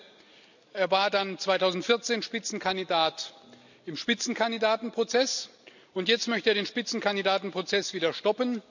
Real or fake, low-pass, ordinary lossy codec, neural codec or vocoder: real; 7.2 kHz; none; none